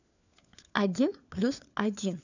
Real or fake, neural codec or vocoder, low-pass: fake; codec, 16 kHz, 4 kbps, FunCodec, trained on LibriTTS, 50 frames a second; 7.2 kHz